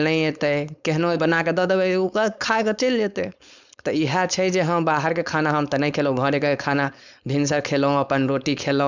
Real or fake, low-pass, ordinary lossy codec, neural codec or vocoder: fake; 7.2 kHz; none; codec, 16 kHz, 4.8 kbps, FACodec